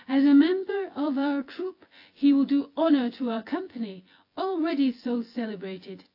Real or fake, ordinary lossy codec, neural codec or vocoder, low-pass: fake; AAC, 32 kbps; vocoder, 24 kHz, 100 mel bands, Vocos; 5.4 kHz